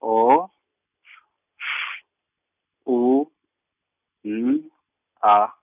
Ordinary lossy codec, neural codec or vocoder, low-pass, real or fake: none; none; 3.6 kHz; real